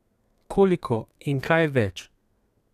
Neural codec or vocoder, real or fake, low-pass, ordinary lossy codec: codec, 32 kHz, 1.9 kbps, SNAC; fake; 14.4 kHz; none